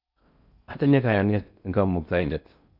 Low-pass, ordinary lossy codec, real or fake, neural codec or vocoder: 5.4 kHz; none; fake; codec, 16 kHz in and 24 kHz out, 0.6 kbps, FocalCodec, streaming, 4096 codes